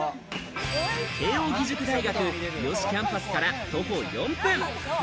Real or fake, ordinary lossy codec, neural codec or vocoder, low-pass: real; none; none; none